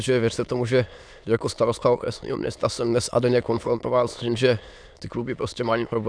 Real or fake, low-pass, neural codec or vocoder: fake; 9.9 kHz; autoencoder, 22.05 kHz, a latent of 192 numbers a frame, VITS, trained on many speakers